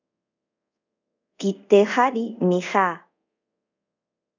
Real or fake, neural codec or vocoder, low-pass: fake; codec, 24 kHz, 0.5 kbps, DualCodec; 7.2 kHz